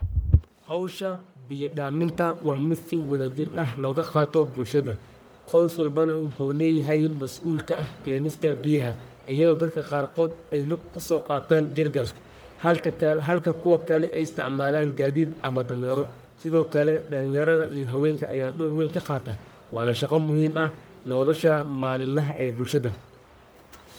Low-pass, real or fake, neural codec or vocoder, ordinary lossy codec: none; fake; codec, 44.1 kHz, 1.7 kbps, Pupu-Codec; none